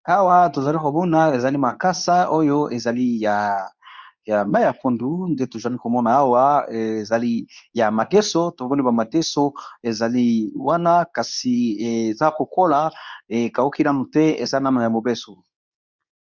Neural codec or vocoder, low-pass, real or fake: codec, 24 kHz, 0.9 kbps, WavTokenizer, medium speech release version 2; 7.2 kHz; fake